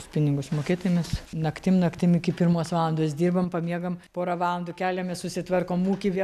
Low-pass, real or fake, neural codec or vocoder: 14.4 kHz; real; none